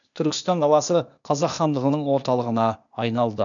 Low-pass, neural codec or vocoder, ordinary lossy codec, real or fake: 7.2 kHz; codec, 16 kHz, 0.8 kbps, ZipCodec; none; fake